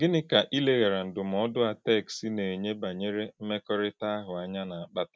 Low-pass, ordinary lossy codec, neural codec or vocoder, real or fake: none; none; none; real